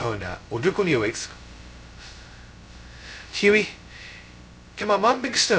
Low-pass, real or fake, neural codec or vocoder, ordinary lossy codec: none; fake; codec, 16 kHz, 0.2 kbps, FocalCodec; none